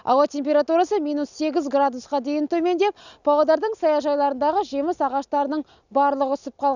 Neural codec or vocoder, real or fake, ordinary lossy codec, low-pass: none; real; none; 7.2 kHz